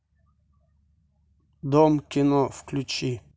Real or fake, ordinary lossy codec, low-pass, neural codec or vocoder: real; none; none; none